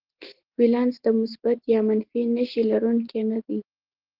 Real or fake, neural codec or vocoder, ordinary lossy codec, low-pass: real; none; Opus, 16 kbps; 5.4 kHz